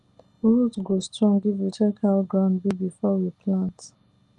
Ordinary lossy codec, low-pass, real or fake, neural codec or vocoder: Opus, 24 kbps; 10.8 kHz; real; none